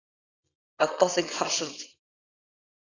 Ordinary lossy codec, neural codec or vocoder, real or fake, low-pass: AAC, 32 kbps; codec, 24 kHz, 0.9 kbps, WavTokenizer, small release; fake; 7.2 kHz